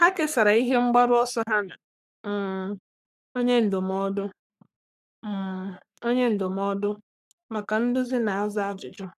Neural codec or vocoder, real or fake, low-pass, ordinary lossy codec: codec, 44.1 kHz, 3.4 kbps, Pupu-Codec; fake; 14.4 kHz; none